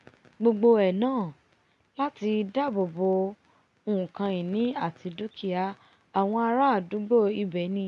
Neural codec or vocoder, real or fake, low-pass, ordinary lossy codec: none; real; 10.8 kHz; none